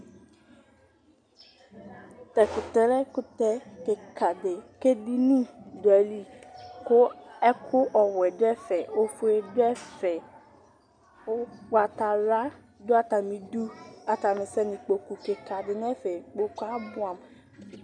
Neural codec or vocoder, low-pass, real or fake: none; 9.9 kHz; real